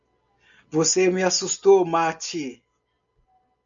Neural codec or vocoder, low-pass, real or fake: none; 7.2 kHz; real